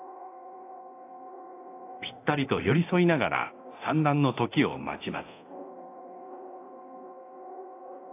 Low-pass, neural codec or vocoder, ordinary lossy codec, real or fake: 3.6 kHz; codec, 24 kHz, 0.9 kbps, DualCodec; AAC, 24 kbps; fake